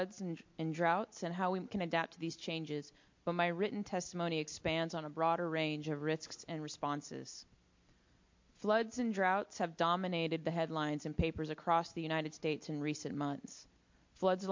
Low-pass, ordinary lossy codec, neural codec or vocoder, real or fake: 7.2 kHz; MP3, 48 kbps; none; real